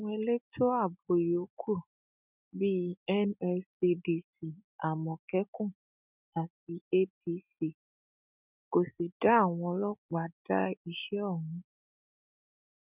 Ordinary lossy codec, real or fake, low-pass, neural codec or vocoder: none; real; 3.6 kHz; none